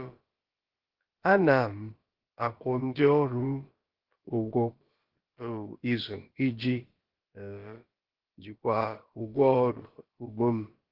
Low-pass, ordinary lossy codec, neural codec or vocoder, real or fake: 5.4 kHz; Opus, 16 kbps; codec, 16 kHz, about 1 kbps, DyCAST, with the encoder's durations; fake